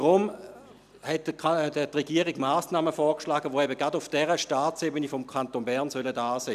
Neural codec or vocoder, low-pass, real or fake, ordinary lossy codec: none; 14.4 kHz; real; none